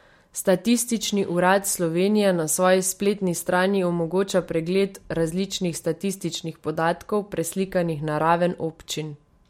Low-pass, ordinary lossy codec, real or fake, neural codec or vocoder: 19.8 kHz; MP3, 64 kbps; real; none